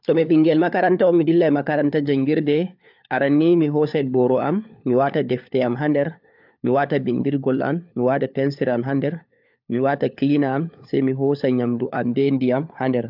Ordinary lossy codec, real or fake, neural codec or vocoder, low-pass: AAC, 48 kbps; fake; codec, 16 kHz, 4 kbps, FunCodec, trained on LibriTTS, 50 frames a second; 5.4 kHz